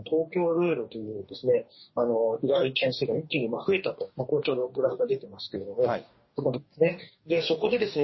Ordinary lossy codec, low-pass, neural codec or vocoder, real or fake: MP3, 24 kbps; 7.2 kHz; codec, 44.1 kHz, 2.6 kbps, DAC; fake